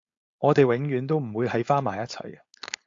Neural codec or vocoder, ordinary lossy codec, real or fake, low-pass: codec, 16 kHz, 4.8 kbps, FACodec; AAC, 48 kbps; fake; 7.2 kHz